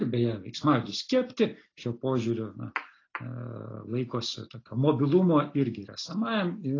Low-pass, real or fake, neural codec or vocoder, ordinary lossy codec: 7.2 kHz; real; none; AAC, 32 kbps